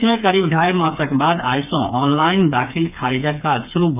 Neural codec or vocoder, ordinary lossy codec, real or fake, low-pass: codec, 16 kHz, 4 kbps, FreqCodec, smaller model; none; fake; 3.6 kHz